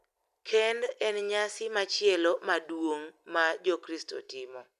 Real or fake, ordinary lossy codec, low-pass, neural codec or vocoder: real; none; 14.4 kHz; none